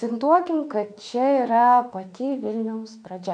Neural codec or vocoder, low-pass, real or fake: autoencoder, 48 kHz, 32 numbers a frame, DAC-VAE, trained on Japanese speech; 9.9 kHz; fake